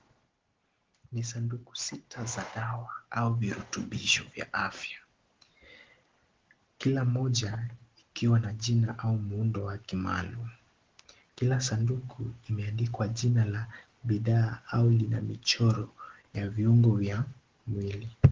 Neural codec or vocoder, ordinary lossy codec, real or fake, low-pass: none; Opus, 16 kbps; real; 7.2 kHz